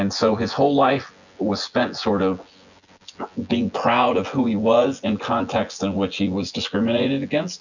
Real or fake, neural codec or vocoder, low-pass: fake; vocoder, 24 kHz, 100 mel bands, Vocos; 7.2 kHz